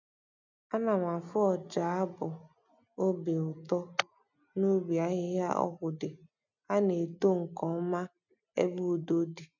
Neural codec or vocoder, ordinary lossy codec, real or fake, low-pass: none; none; real; 7.2 kHz